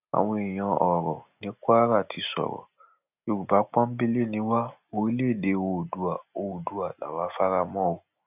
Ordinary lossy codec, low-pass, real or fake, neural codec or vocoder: none; 3.6 kHz; real; none